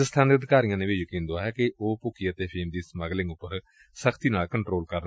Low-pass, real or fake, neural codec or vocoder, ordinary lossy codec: none; real; none; none